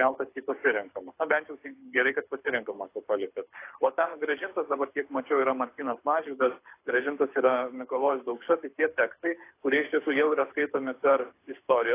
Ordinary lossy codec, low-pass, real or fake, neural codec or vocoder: AAC, 24 kbps; 3.6 kHz; fake; codec, 24 kHz, 6 kbps, HILCodec